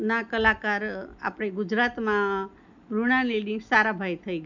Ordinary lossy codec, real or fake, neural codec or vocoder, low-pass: none; real; none; 7.2 kHz